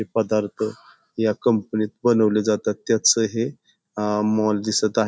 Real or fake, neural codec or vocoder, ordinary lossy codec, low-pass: real; none; none; none